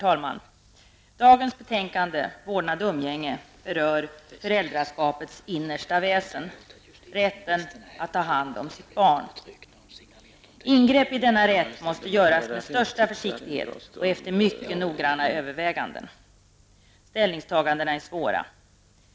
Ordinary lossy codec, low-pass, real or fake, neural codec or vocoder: none; none; real; none